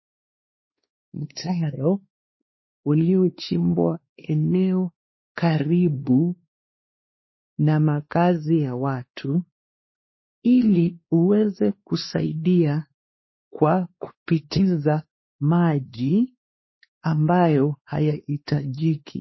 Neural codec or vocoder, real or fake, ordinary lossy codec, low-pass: codec, 16 kHz, 2 kbps, X-Codec, WavLM features, trained on Multilingual LibriSpeech; fake; MP3, 24 kbps; 7.2 kHz